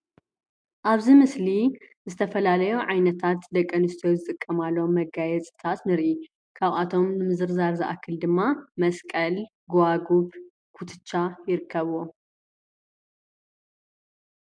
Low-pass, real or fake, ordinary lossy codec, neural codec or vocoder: 9.9 kHz; real; MP3, 64 kbps; none